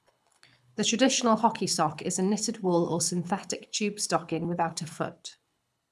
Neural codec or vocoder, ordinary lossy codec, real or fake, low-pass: codec, 24 kHz, 6 kbps, HILCodec; none; fake; none